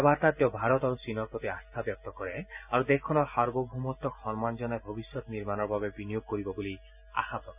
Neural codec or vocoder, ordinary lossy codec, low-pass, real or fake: none; none; 3.6 kHz; real